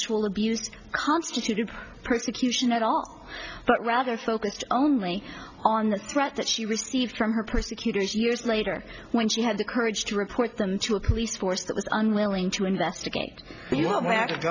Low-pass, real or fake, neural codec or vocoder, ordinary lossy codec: 7.2 kHz; real; none; Opus, 64 kbps